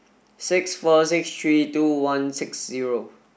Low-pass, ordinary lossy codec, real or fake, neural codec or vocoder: none; none; real; none